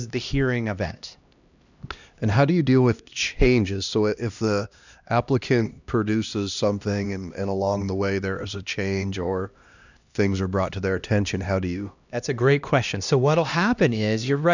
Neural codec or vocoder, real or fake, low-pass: codec, 16 kHz, 1 kbps, X-Codec, HuBERT features, trained on LibriSpeech; fake; 7.2 kHz